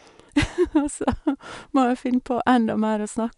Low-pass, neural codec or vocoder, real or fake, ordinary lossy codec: 10.8 kHz; none; real; none